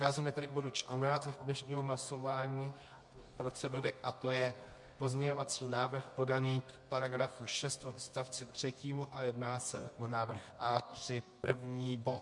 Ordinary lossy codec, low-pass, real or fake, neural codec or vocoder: MP3, 64 kbps; 10.8 kHz; fake; codec, 24 kHz, 0.9 kbps, WavTokenizer, medium music audio release